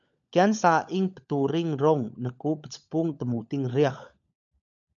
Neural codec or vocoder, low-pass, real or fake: codec, 16 kHz, 16 kbps, FunCodec, trained on LibriTTS, 50 frames a second; 7.2 kHz; fake